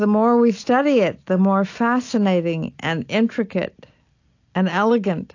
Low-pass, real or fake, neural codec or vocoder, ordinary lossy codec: 7.2 kHz; real; none; AAC, 48 kbps